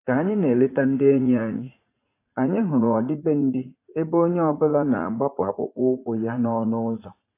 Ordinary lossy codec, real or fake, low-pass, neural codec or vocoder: AAC, 24 kbps; fake; 3.6 kHz; vocoder, 44.1 kHz, 80 mel bands, Vocos